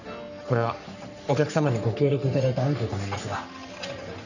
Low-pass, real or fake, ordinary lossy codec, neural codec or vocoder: 7.2 kHz; fake; none; codec, 44.1 kHz, 3.4 kbps, Pupu-Codec